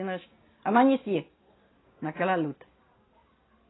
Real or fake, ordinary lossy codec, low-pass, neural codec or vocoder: real; AAC, 16 kbps; 7.2 kHz; none